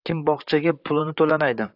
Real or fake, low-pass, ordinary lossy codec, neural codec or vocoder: fake; 5.4 kHz; AAC, 48 kbps; vocoder, 44.1 kHz, 128 mel bands, Pupu-Vocoder